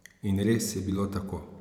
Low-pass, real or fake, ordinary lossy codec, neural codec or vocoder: 19.8 kHz; fake; none; vocoder, 44.1 kHz, 128 mel bands every 512 samples, BigVGAN v2